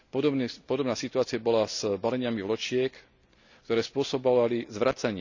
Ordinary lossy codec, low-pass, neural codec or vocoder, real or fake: none; 7.2 kHz; none; real